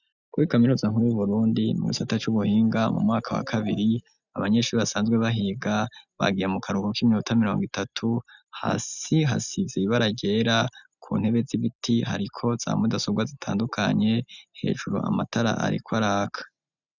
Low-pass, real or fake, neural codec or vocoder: 7.2 kHz; real; none